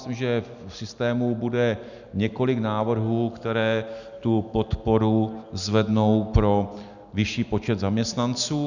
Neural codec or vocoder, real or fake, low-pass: none; real; 7.2 kHz